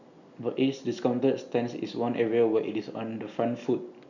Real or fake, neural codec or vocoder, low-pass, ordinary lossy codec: real; none; 7.2 kHz; none